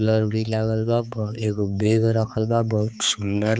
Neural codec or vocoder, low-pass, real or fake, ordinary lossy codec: codec, 16 kHz, 4 kbps, X-Codec, HuBERT features, trained on balanced general audio; none; fake; none